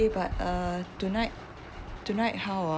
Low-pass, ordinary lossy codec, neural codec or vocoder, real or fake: none; none; none; real